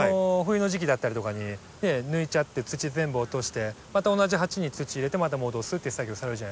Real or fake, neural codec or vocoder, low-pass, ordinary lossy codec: real; none; none; none